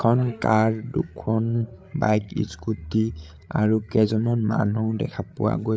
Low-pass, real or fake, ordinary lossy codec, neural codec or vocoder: none; fake; none; codec, 16 kHz, 8 kbps, FreqCodec, larger model